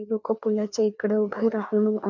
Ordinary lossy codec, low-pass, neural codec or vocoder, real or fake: none; 7.2 kHz; codec, 16 kHz, 2 kbps, FreqCodec, larger model; fake